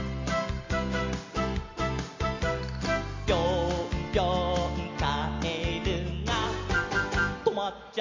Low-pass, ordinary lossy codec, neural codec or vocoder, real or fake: 7.2 kHz; MP3, 48 kbps; none; real